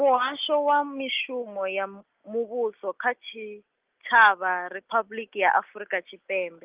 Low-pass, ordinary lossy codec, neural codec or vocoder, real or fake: 3.6 kHz; Opus, 32 kbps; none; real